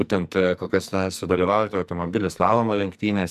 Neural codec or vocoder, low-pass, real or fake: codec, 44.1 kHz, 2.6 kbps, SNAC; 14.4 kHz; fake